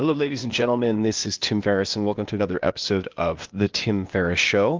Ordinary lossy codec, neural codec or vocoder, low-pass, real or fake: Opus, 32 kbps; codec, 16 kHz, 0.8 kbps, ZipCodec; 7.2 kHz; fake